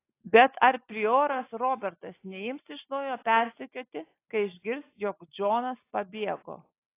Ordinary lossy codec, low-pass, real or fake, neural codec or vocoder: AAC, 24 kbps; 3.6 kHz; real; none